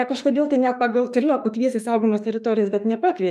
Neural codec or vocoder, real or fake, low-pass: autoencoder, 48 kHz, 32 numbers a frame, DAC-VAE, trained on Japanese speech; fake; 14.4 kHz